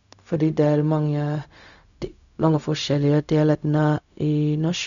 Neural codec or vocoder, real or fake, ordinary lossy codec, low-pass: codec, 16 kHz, 0.4 kbps, LongCat-Audio-Codec; fake; none; 7.2 kHz